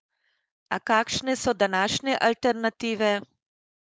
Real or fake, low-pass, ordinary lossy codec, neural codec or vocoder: fake; none; none; codec, 16 kHz, 4.8 kbps, FACodec